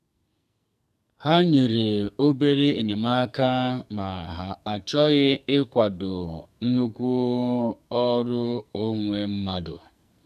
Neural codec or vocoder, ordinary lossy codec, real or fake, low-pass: codec, 44.1 kHz, 2.6 kbps, SNAC; none; fake; 14.4 kHz